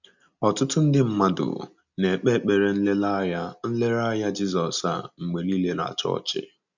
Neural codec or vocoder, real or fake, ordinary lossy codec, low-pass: none; real; none; 7.2 kHz